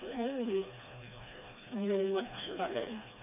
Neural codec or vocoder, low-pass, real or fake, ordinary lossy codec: codec, 16 kHz, 2 kbps, FreqCodec, smaller model; 3.6 kHz; fake; none